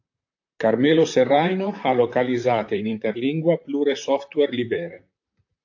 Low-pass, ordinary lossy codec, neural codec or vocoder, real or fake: 7.2 kHz; AAC, 48 kbps; vocoder, 44.1 kHz, 128 mel bands, Pupu-Vocoder; fake